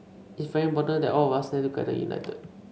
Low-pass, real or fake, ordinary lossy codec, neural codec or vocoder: none; real; none; none